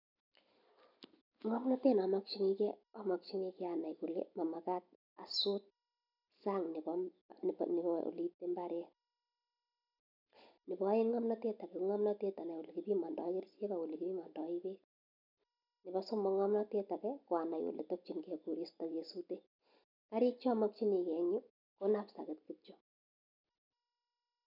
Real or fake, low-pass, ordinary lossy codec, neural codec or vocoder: real; 5.4 kHz; none; none